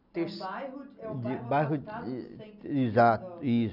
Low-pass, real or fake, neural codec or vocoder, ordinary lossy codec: 5.4 kHz; real; none; none